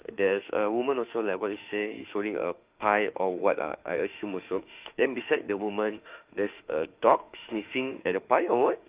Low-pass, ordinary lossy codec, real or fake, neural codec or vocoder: 3.6 kHz; Opus, 24 kbps; fake; autoencoder, 48 kHz, 32 numbers a frame, DAC-VAE, trained on Japanese speech